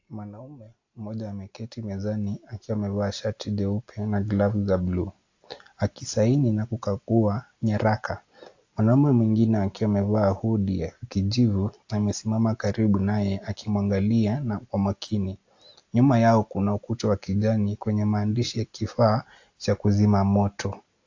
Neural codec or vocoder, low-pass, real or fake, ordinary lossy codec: none; 7.2 kHz; real; AAC, 48 kbps